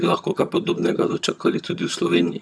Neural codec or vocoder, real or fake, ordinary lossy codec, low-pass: vocoder, 22.05 kHz, 80 mel bands, HiFi-GAN; fake; none; none